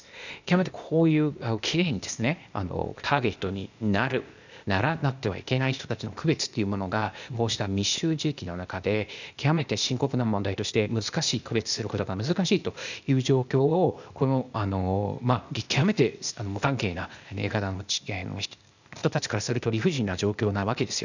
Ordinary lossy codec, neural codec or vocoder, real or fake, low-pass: none; codec, 16 kHz, 0.8 kbps, ZipCodec; fake; 7.2 kHz